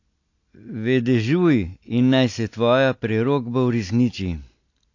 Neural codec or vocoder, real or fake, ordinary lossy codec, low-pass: none; real; AAC, 48 kbps; 7.2 kHz